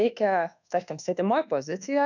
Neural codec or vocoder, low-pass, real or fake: codec, 24 kHz, 1.2 kbps, DualCodec; 7.2 kHz; fake